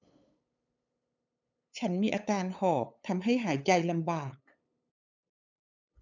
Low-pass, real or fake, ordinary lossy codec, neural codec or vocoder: 7.2 kHz; fake; none; codec, 16 kHz, 8 kbps, FunCodec, trained on LibriTTS, 25 frames a second